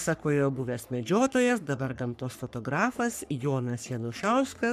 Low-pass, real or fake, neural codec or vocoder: 14.4 kHz; fake; codec, 44.1 kHz, 3.4 kbps, Pupu-Codec